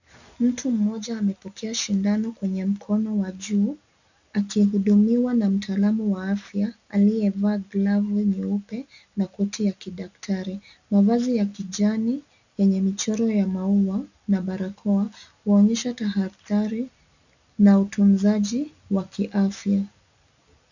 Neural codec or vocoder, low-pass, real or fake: none; 7.2 kHz; real